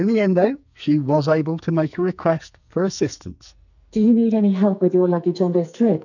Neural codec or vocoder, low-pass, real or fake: codec, 44.1 kHz, 2.6 kbps, SNAC; 7.2 kHz; fake